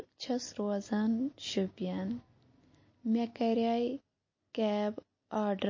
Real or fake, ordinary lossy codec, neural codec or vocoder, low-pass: real; MP3, 32 kbps; none; 7.2 kHz